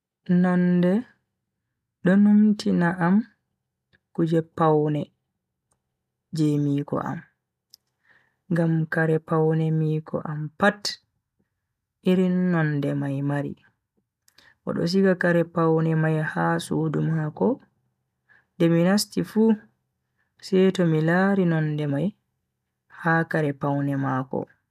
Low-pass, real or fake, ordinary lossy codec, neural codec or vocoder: 14.4 kHz; real; none; none